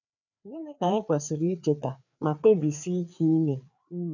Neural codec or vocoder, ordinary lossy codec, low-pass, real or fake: codec, 16 kHz, 4 kbps, FreqCodec, larger model; none; 7.2 kHz; fake